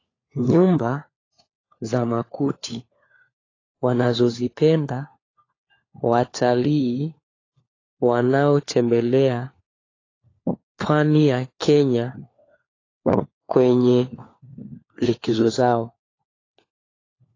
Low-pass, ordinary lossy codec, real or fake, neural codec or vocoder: 7.2 kHz; AAC, 32 kbps; fake; codec, 16 kHz, 4 kbps, FunCodec, trained on LibriTTS, 50 frames a second